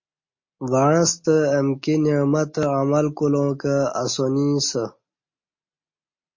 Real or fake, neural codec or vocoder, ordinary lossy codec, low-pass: real; none; MP3, 32 kbps; 7.2 kHz